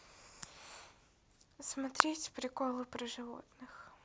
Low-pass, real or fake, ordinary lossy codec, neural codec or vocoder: none; real; none; none